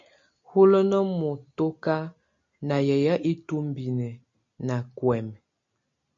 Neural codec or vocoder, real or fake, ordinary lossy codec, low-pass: none; real; MP3, 48 kbps; 7.2 kHz